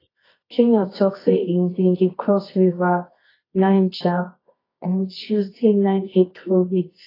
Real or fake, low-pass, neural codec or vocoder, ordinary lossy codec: fake; 5.4 kHz; codec, 24 kHz, 0.9 kbps, WavTokenizer, medium music audio release; AAC, 24 kbps